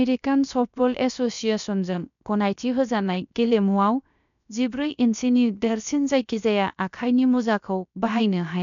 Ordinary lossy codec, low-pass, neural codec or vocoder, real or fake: none; 7.2 kHz; codec, 16 kHz, about 1 kbps, DyCAST, with the encoder's durations; fake